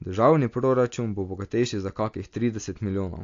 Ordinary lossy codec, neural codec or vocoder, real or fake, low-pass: AAC, 48 kbps; none; real; 7.2 kHz